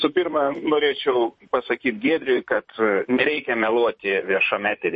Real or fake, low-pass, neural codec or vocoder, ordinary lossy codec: fake; 10.8 kHz; vocoder, 44.1 kHz, 128 mel bands, Pupu-Vocoder; MP3, 32 kbps